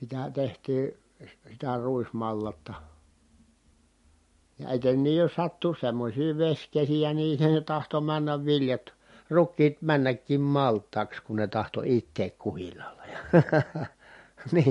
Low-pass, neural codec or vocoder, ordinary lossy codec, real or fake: 14.4 kHz; none; MP3, 48 kbps; real